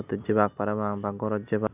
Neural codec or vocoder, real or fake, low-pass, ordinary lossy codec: none; real; 3.6 kHz; none